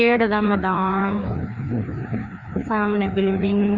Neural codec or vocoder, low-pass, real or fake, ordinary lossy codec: codec, 16 kHz, 2 kbps, FreqCodec, larger model; 7.2 kHz; fake; Opus, 64 kbps